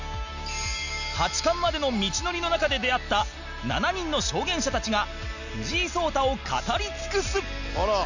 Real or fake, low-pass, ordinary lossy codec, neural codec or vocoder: real; 7.2 kHz; none; none